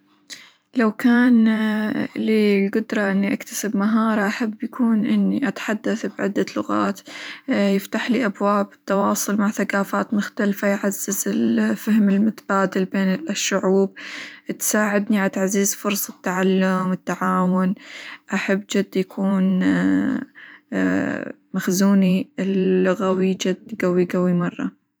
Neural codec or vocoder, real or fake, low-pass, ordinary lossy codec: vocoder, 44.1 kHz, 128 mel bands every 512 samples, BigVGAN v2; fake; none; none